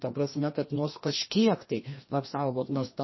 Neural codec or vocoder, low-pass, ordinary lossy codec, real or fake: codec, 16 kHz, 1 kbps, FreqCodec, larger model; 7.2 kHz; MP3, 24 kbps; fake